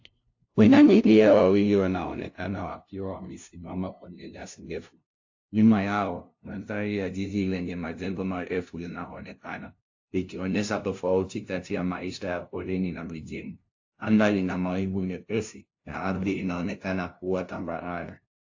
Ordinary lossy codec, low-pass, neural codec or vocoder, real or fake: AAC, 48 kbps; 7.2 kHz; codec, 16 kHz, 0.5 kbps, FunCodec, trained on LibriTTS, 25 frames a second; fake